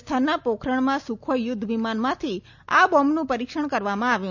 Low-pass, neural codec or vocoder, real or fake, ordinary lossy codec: 7.2 kHz; none; real; none